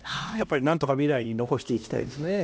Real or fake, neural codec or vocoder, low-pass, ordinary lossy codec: fake; codec, 16 kHz, 1 kbps, X-Codec, HuBERT features, trained on LibriSpeech; none; none